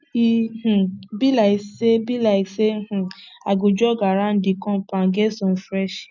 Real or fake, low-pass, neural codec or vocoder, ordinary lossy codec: real; 7.2 kHz; none; none